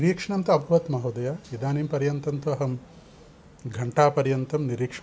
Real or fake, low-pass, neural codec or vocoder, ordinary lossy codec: real; none; none; none